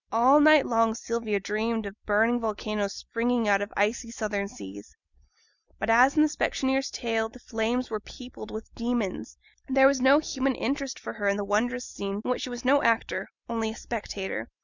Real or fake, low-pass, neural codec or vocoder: fake; 7.2 kHz; vocoder, 44.1 kHz, 128 mel bands every 256 samples, BigVGAN v2